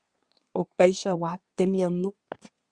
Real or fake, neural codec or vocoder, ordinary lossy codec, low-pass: fake; codec, 24 kHz, 1 kbps, SNAC; Opus, 64 kbps; 9.9 kHz